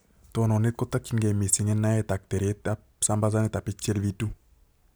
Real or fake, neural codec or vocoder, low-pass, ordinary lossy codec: real; none; none; none